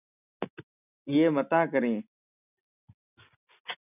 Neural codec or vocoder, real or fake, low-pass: none; real; 3.6 kHz